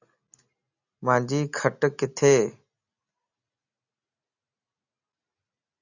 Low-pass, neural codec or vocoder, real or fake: 7.2 kHz; none; real